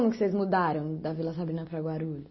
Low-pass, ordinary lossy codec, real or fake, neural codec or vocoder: 7.2 kHz; MP3, 24 kbps; real; none